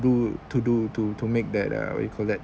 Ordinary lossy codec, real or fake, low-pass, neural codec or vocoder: none; real; none; none